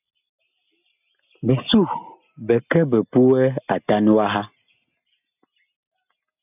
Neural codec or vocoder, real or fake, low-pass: none; real; 3.6 kHz